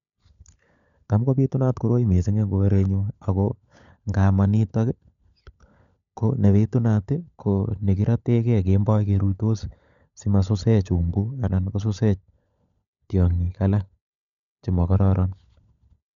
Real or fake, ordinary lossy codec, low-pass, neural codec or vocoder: fake; none; 7.2 kHz; codec, 16 kHz, 16 kbps, FunCodec, trained on LibriTTS, 50 frames a second